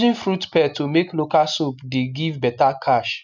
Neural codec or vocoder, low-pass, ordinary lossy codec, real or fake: none; 7.2 kHz; none; real